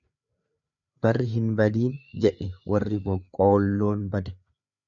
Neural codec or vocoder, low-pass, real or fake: codec, 16 kHz, 4 kbps, FreqCodec, larger model; 7.2 kHz; fake